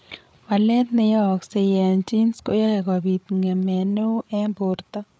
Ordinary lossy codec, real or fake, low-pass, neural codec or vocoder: none; fake; none; codec, 16 kHz, 8 kbps, FreqCodec, larger model